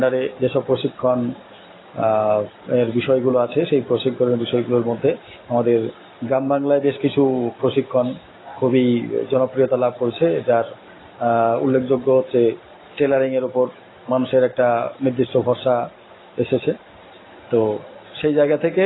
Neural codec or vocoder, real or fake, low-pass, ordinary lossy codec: none; real; 7.2 kHz; AAC, 16 kbps